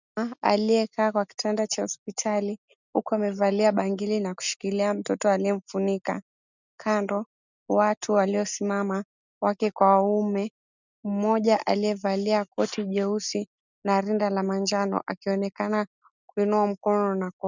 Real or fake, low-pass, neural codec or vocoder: real; 7.2 kHz; none